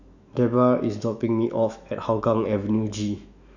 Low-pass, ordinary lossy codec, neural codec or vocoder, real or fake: 7.2 kHz; none; autoencoder, 48 kHz, 128 numbers a frame, DAC-VAE, trained on Japanese speech; fake